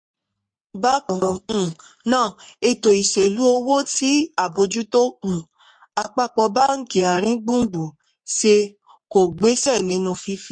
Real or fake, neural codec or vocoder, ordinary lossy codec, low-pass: fake; codec, 44.1 kHz, 3.4 kbps, Pupu-Codec; MP3, 48 kbps; 9.9 kHz